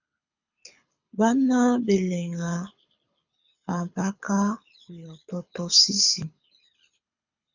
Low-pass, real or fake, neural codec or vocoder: 7.2 kHz; fake; codec, 24 kHz, 6 kbps, HILCodec